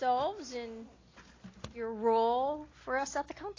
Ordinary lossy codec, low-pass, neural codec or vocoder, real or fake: AAC, 32 kbps; 7.2 kHz; none; real